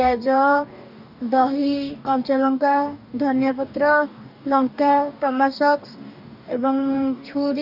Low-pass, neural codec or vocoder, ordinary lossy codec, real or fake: 5.4 kHz; codec, 44.1 kHz, 2.6 kbps, DAC; none; fake